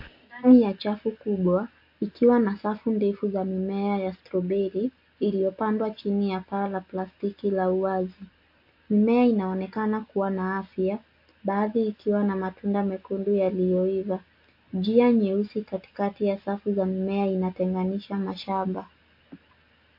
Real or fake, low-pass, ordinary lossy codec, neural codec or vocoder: real; 5.4 kHz; MP3, 32 kbps; none